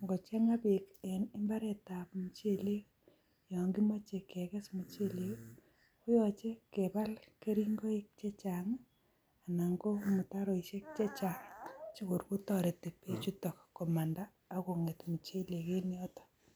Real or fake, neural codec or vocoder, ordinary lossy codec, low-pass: real; none; none; none